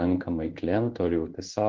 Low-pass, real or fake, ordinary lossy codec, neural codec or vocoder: 7.2 kHz; fake; Opus, 16 kbps; codec, 16 kHz in and 24 kHz out, 1 kbps, XY-Tokenizer